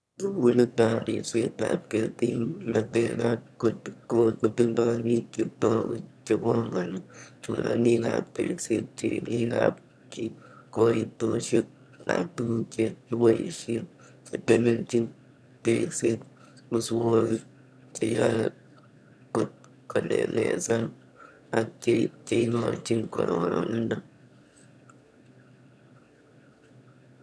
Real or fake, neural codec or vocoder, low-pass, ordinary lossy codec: fake; autoencoder, 22.05 kHz, a latent of 192 numbers a frame, VITS, trained on one speaker; none; none